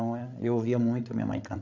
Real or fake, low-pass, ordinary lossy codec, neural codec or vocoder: fake; 7.2 kHz; none; codec, 16 kHz, 8 kbps, FreqCodec, larger model